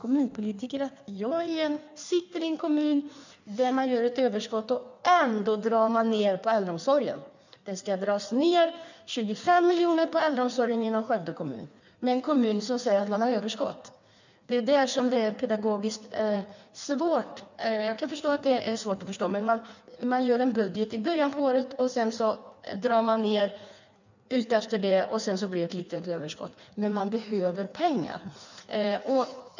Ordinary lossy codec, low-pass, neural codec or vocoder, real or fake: none; 7.2 kHz; codec, 16 kHz in and 24 kHz out, 1.1 kbps, FireRedTTS-2 codec; fake